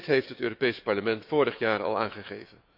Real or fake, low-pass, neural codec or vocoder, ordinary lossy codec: fake; 5.4 kHz; autoencoder, 48 kHz, 128 numbers a frame, DAC-VAE, trained on Japanese speech; none